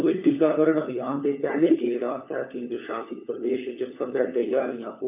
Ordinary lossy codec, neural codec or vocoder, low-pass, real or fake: none; codec, 16 kHz, 4 kbps, FunCodec, trained on LibriTTS, 50 frames a second; 3.6 kHz; fake